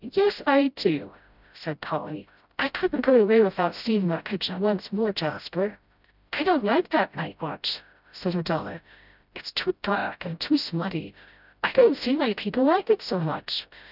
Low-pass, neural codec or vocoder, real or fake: 5.4 kHz; codec, 16 kHz, 0.5 kbps, FreqCodec, smaller model; fake